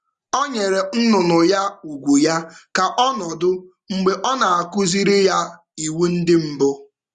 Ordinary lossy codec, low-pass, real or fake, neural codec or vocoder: Opus, 64 kbps; 10.8 kHz; real; none